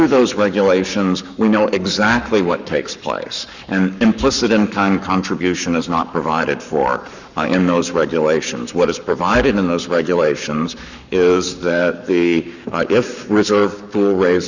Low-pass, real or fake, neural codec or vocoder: 7.2 kHz; fake; codec, 44.1 kHz, 7.8 kbps, Pupu-Codec